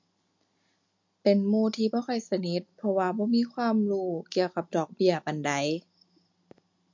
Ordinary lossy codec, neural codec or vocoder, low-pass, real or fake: MP3, 48 kbps; none; 7.2 kHz; real